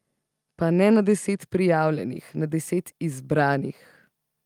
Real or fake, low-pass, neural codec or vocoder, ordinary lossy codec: real; 19.8 kHz; none; Opus, 32 kbps